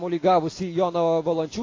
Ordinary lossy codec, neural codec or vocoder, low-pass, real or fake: AAC, 32 kbps; none; 7.2 kHz; real